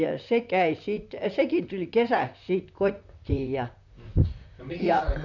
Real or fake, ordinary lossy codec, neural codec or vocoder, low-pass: fake; none; vocoder, 44.1 kHz, 128 mel bands, Pupu-Vocoder; 7.2 kHz